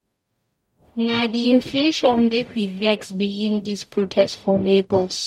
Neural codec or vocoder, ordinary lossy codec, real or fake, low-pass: codec, 44.1 kHz, 0.9 kbps, DAC; MP3, 64 kbps; fake; 19.8 kHz